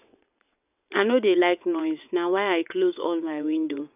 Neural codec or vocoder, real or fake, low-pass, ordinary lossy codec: vocoder, 22.05 kHz, 80 mel bands, WaveNeXt; fake; 3.6 kHz; none